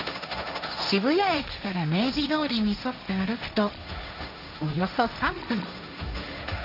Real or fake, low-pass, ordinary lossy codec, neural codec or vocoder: fake; 5.4 kHz; none; codec, 16 kHz, 1.1 kbps, Voila-Tokenizer